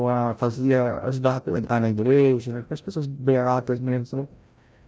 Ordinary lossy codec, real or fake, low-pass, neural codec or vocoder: none; fake; none; codec, 16 kHz, 0.5 kbps, FreqCodec, larger model